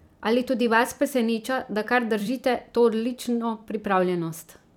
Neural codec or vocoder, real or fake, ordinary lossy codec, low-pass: vocoder, 44.1 kHz, 128 mel bands every 256 samples, BigVGAN v2; fake; none; 19.8 kHz